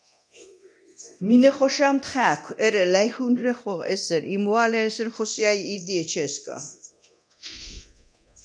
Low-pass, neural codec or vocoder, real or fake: 9.9 kHz; codec, 24 kHz, 0.9 kbps, DualCodec; fake